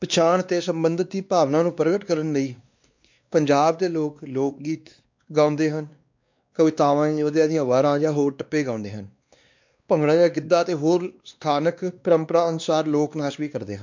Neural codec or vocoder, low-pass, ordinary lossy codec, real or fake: codec, 16 kHz, 2 kbps, X-Codec, WavLM features, trained on Multilingual LibriSpeech; 7.2 kHz; MP3, 64 kbps; fake